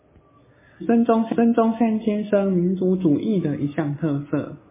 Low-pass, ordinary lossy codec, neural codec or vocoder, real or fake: 3.6 kHz; MP3, 16 kbps; none; real